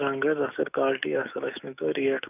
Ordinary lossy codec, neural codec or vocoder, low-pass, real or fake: none; autoencoder, 48 kHz, 128 numbers a frame, DAC-VAE, trained on Japanese speech; 3.6 kHz; fake